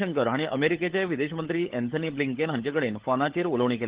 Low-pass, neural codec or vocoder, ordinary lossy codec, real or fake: 3.6 kHz; codec, 16 kHz, 16 kbps, FunCodec, trained on LibriTTS, 50 frames a second; Opus, 16 kbps; fake